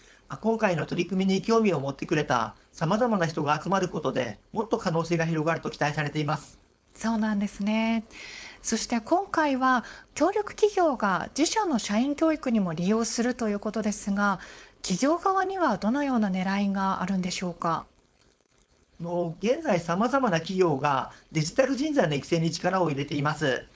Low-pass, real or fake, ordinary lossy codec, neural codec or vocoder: none; fake; none; codec, 16 kHz, 4.8 kbps, FACodec